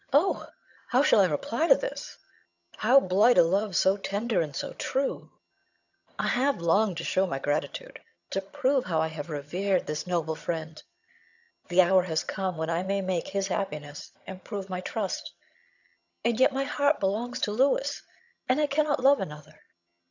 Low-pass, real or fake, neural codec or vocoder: 7.2 kHz; fake; vocoder, 22.05 kHz, 80 mel bands, HiFi-GAN